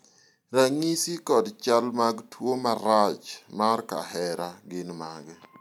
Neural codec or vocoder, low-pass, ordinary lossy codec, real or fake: none; 19.8 kHz; none; real